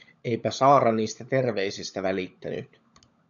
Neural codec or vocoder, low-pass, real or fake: codec, 16 kHz, 16 kbps, FunCodec, trained on LibriTTS, 50 frames a second; 7.2 kHz; fake